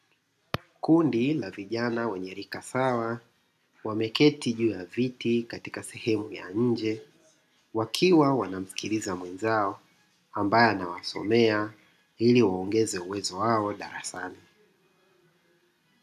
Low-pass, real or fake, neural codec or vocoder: 14.4 kHz; real; none